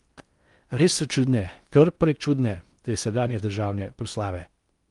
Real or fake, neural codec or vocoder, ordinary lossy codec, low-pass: fake; codec, 16 kHz in and 24 kHz out, 0.6 kbps, FocalCodec, streaming, 4096 codes; Opus, 32 kbps; 10.8 kHz